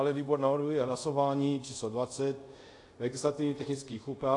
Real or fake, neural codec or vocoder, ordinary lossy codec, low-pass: fake; codec, 24 kHz, 0.5 kbps, DualCodec; AAC, 48 kbps; 10.8 kHz